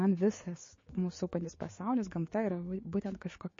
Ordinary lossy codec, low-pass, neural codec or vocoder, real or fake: MP3, 32 kbps; 7.2 kHz; codec, 16 kHz, 6 kbps, DAC; fake